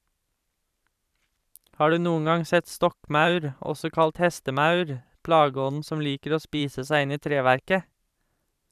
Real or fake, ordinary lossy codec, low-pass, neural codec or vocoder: fake; none; 14.4 kHz; vocoder, 44.1 kHz, 128 mel bands every 512 samples, BigVGAN v2